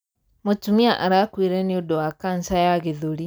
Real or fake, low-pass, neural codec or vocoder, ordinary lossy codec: real; none; none; none